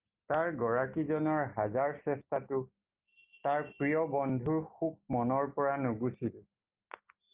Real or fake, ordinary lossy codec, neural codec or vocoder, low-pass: real; Opus, 32 kbps; none; 3.6 kHz